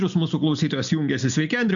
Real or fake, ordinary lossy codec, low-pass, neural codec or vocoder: real; MP3, 64 kbps; 7.2 kHz; none